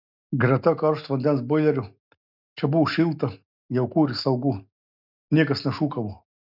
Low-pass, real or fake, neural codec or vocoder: 5.4 kHz; real; none